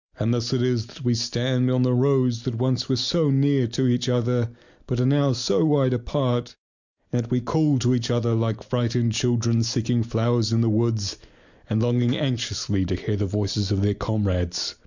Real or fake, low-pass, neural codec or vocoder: real; 7.2 kHz; none